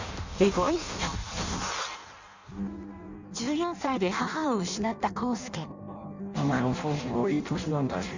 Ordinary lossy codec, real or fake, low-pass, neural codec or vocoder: Opus, 64 kbps; fake; 7.2 kHz; codec, 16 kHz in and 24 kHz out, 0.6 kbps, FireRedTTS-2 codec